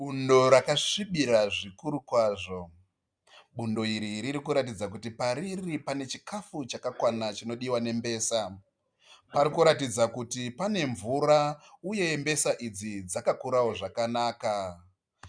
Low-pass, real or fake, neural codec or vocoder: 9.9 kHz; real; none